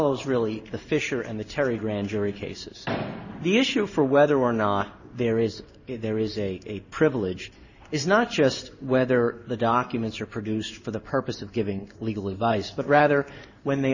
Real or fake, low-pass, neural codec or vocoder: fake; 7.2 kHz; codec, 16 kHz in and 24 kHz out, 1 kbps, XY-Tokenizer